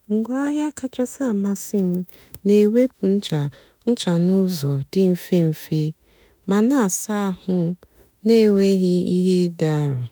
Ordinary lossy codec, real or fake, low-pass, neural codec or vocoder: none; fake; none; autoencoder, 48 kHz, 32 numbers a frame, DAC-VAE, trained on Japanese speech